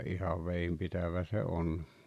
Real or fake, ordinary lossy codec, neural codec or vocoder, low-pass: real; none; none; 14.4 kHz